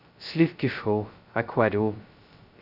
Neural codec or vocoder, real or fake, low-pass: codec, 16 kHz, 0.2 kbps, FocalCodec; fake; 5.4 kHz